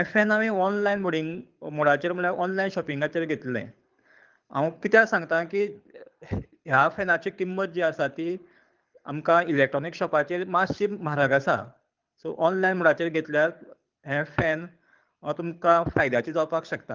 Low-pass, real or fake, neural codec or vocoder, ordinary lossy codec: 7.2 kHz; fake; codec, 24 kHz, 6 kbps, HILCodec; Opus, 32 kbps